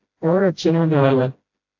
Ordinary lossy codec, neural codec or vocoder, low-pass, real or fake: AAC, 48 kbps; codec, 16 kHz, 0.5 kbps, FreqCodec, smaller model; 7.2 kHz; fake